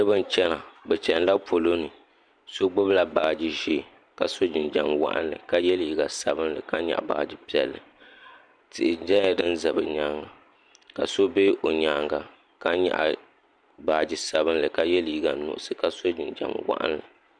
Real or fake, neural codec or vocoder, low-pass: real; none; 9.9 kHz